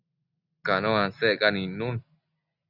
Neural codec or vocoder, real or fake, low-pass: none; real; 5.4 kHz